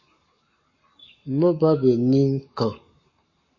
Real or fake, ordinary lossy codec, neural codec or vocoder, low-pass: fake; MP3, 32 kbps; codec, 44.1 kHz, 7.8 kbps, Pupu-Codec; 7.2 kHz